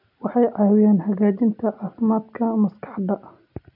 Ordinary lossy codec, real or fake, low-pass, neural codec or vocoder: none; real; 5.4 kHz; none